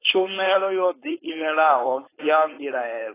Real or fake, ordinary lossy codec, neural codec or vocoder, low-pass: fake; AAC, 16 kbps; codec, 16 kHz, 4.8 kbps, FACodec; 3.6 kHz